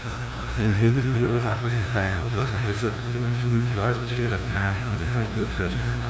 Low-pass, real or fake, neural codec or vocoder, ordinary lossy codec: none; fake; codec, 16 kHz, 0.5 kbps, FunCodec, trained on LibriTTS, 25 frames a second; none